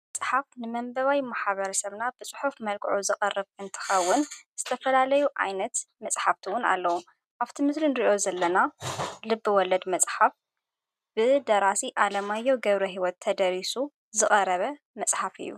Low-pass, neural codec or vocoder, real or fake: 14.4 kHz; none; real